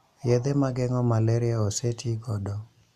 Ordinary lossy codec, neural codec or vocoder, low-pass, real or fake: none; none; 14.4 kHz; real